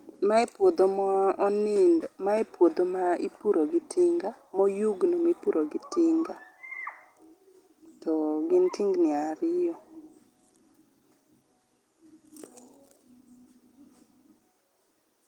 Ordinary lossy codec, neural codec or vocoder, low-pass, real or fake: Opus, 24 kbps; none; 19.8 kHz; real